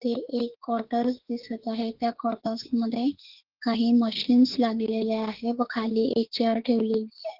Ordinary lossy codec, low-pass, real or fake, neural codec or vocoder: Opus, 16 kbps; 5.4 kHz; fake; autoencoder, 48 kHz, 32 numbers a frame, DAC-VAE, trained on Japanese speech